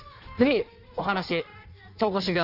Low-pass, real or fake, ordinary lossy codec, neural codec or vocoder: 5.4 kHz; fake; none; codec, 16 kHz in and 24 kHz out, 1.1 kbps, FireRedTTS-2 codec